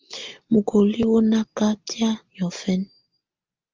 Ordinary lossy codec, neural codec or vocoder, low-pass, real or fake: Opus, 32 kbps; none; 7.2 kHz; real